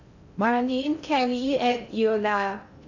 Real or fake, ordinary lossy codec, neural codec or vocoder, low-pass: fake; none; codec, 16 kHz in and 24 kHz out, 0.6 kbps, FocalCodec, streaming, 4096 codes; 7.2 kHz